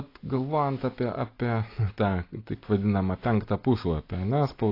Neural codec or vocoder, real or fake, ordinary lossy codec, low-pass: none; real; AAC, 32 kbps; 5.4 kHz